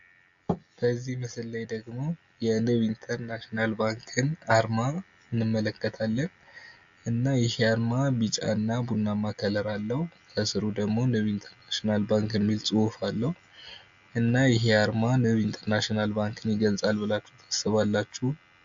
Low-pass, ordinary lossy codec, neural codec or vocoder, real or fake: 7.2 kHz; AAC, 64 kbps; none; real